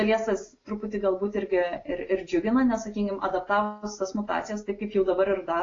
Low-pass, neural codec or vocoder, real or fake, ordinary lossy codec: 7.2 kHz; none; real; AAC, 32 kbps